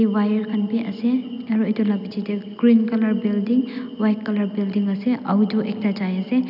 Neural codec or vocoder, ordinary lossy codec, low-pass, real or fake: none; none; 5.4 kHz; real